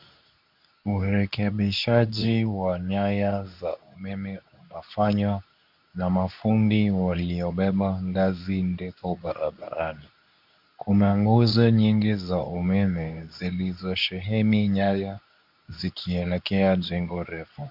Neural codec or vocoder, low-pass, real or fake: codec, 24 kHz, 0.9 kbps, WavTokenizer, medium speech release version 2; 5.4 kHz; fake